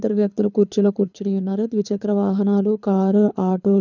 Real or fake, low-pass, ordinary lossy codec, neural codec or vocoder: fake; 7.2 kHz; none; codec, 24 kHz, 6 kbps, HILCodec